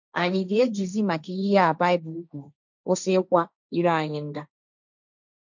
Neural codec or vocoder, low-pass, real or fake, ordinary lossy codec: codec, 16 kHz, 1.1 kbps, Voila-Tokenizer; 7.2 kHz; fake; none